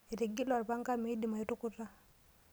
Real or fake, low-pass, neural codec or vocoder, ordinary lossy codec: real; none; none; none